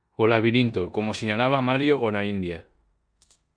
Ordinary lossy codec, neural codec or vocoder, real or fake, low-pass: Opus, 64 kbps; codec, 16 kHz in and 24 kHz out, 0.9 kbps, LongCat-Audio-Codec, four codebook decoder; fake; 9.9 kHz